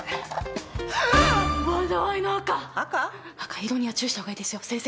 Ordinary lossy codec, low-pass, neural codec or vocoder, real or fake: none; none; none; real